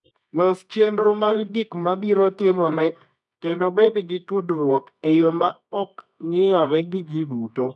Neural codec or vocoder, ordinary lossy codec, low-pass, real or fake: codec, 24 kHz, 0.9 kbps, WavTokenizer, medium music audio release; none; 10.8 kHz; fake